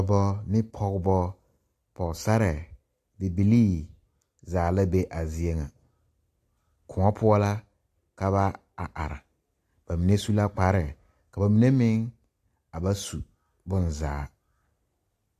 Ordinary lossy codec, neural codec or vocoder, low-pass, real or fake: AAC, 64 kbps; none; 14.4 kHz; real